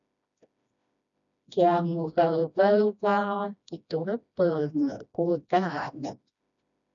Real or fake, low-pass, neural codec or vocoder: fake; 7.2 kHz; codec, 16 kHz, 1 kbps, FreqCodec, smaller model